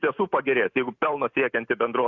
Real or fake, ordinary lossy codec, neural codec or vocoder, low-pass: real; Opus, 64 kbps; none; 7.2 kHz